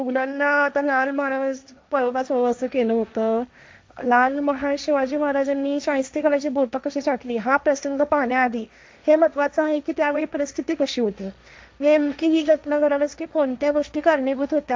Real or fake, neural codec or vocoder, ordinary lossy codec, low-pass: fake; codec, 16 kHz, 1.1 kbps, Voila-Tokenizer; none; none